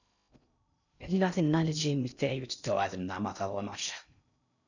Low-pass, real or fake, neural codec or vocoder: 7.2 kHz; fake; codec, 16 kHz in and 24 kHz out, 0.6 kbps, FocalCodec, streaming, 2048 codes